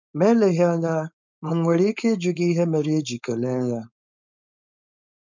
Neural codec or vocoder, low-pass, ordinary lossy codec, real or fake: codec, 16 kHz, 4.8 kbps, FACodec; 7.2 kHz; none; fake